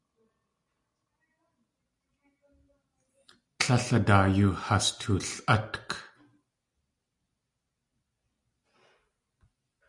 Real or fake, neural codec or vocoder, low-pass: real; none; 10.8 kHz